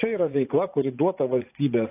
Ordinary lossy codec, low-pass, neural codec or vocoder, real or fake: AAC, 24 kbps; 3.6 kHz; vocoder, 44.1 kHz, 80 mel bands, Vocos; fake